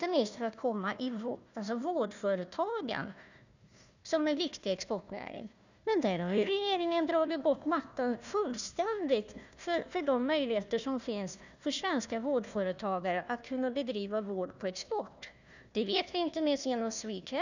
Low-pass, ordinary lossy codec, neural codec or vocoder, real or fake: 7.2 kHz; none; codec, 16 kHz, 1 kbps, FunCodec, trained on Chinese and English, 50 frames a second; fake